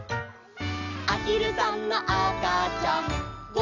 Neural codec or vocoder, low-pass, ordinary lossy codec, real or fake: none; 7.2 kHz; none; real